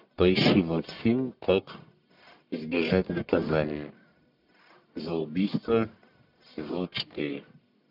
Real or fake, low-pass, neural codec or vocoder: fake; 5.4 kHz; codec, 44.1 kHz, 1.7 kbps, Pupu-Codec